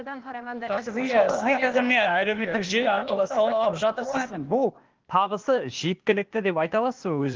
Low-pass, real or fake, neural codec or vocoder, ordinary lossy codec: 7.2 kHz; fake; codec, 16 kHz, 0.8 kbps, ZipCodec; Opus, 32 kbps